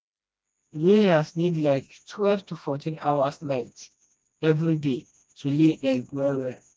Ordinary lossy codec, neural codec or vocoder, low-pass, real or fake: none; codec, 16 kHz, 1 kbps, FreqCodec, smaller model; none; fake